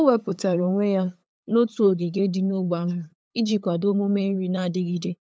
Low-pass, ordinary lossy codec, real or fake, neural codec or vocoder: none; none; fake; codec, 16 kHz, 8 kbps, FunCodec, trained on LibriTTS, 25 frames a second